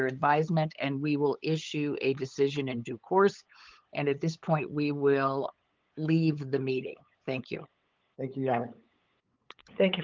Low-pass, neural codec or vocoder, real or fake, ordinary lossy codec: 7.2 kHz; codec, 16 kHz, 8 kbps, FunCodec, trained on LibriTTS, 25 frames a second; fake; Opus, 24 kbps